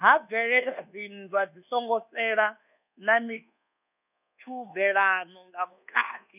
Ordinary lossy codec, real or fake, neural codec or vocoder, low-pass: none; fake; codec, 24 kHz, 1.2 kbps, DualCodec; 3.6 kHz